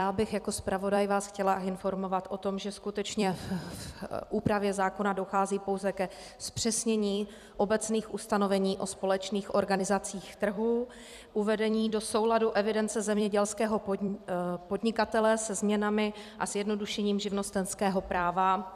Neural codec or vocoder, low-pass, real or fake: vocoder, 44.1 kHz, 128 mel bands every 256 samples, BigVGAN v2; 14.4 kHz; fake